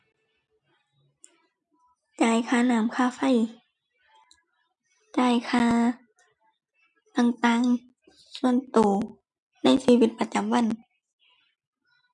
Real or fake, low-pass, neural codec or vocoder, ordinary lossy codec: real; 10.8 kHz; none; none